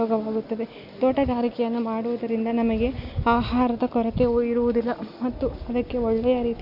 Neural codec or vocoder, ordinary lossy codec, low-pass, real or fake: none; none; 5.4 kHz; real